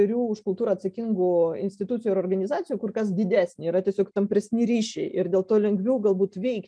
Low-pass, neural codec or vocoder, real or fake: 9.9 kHz; none; real